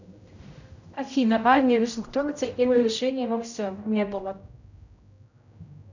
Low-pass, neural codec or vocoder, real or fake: 7.2 kHz; codec, 16 kHz, 0.5 kbps, X-Codec, HuBERT features, trained on general audio; fake